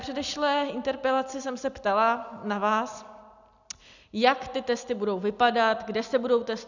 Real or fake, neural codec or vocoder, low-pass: real; none; 7.2 kHz